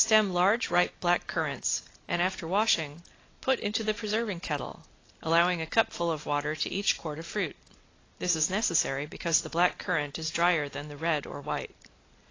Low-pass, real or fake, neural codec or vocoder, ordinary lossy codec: 7.2 kHz; real; none; AAC, 32 kbps